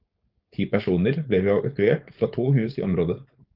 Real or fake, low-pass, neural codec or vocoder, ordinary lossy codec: fake; 5.4 kHz; codec, 16 kHz, 4.8 kbps, FACodec; Opus, 24 kbps